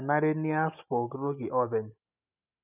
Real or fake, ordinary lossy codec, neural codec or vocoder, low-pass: real; none; none; 3.6 kHz